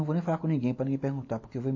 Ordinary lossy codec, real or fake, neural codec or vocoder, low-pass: MP3, 32 kbps; real; none; 7.2 kHz